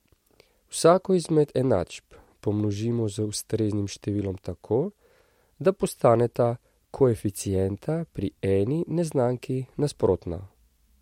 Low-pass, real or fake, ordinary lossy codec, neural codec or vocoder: 19.8 kHz; real; MP3, 64 kbps; none